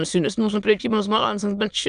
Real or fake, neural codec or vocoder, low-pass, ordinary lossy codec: fake; autoencoder, 22.05 kHz, a latent of 192 numbers a frame, VITS, trained on many speakers; 9.9 kHz; AAC, 96 kbps